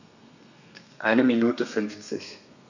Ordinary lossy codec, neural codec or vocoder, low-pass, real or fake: none; codec, 32 kHz, 1.9 kbps, SNAC; 7.2 kHz; fake